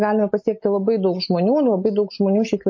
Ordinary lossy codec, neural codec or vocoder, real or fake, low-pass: MP3, 32 kbps; none; real; 7.2 kHz